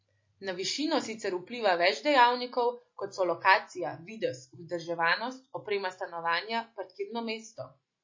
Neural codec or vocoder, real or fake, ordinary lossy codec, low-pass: none; real; MP3, 32 kbps; 7.2 kHz